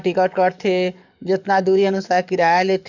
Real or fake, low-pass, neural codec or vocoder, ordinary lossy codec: fake; 7.2 kHz; codec, 44.1 kHz, 7.8 kbps, DAC; none